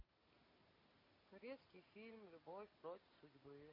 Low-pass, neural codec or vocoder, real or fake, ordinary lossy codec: 5.4 kHz; none; real; MP3, 24 kbps